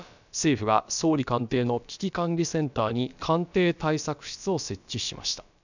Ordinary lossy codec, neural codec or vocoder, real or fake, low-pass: none; codec, 16 kHz, about 1 kbps, DyCAST, with the encoder's durations; fake; 7.2 kHz